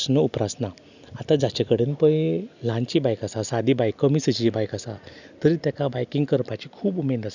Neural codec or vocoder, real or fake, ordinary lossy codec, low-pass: none; real; none; 7.2 kHz